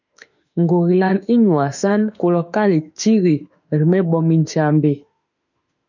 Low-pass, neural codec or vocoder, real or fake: 7.2 kHz; autoencoder, 48 kHz, 32 numbers a frame, DAC-VAE, trained on Japanese speech; fake